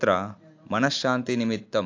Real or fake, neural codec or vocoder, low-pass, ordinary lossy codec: real; none; 7.2 kHz; AAC, 48 kbps